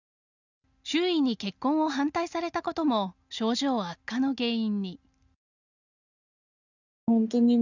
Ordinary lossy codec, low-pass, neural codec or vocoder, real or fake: none; 7.2 kHz; none; real